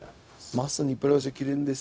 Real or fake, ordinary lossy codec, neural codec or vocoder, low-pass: fake; none; codec, 16 kHz, 0.4 kbps, LongCat-Audio-Codec; none